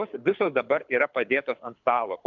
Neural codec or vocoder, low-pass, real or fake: codec, 24 kHz, 6 kbps, HILCodec; 7.2 kHz; fake